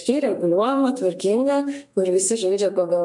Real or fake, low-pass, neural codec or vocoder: fake; 10.8 kHz; codec, 32 kHz, 1.9 kbps, SNAC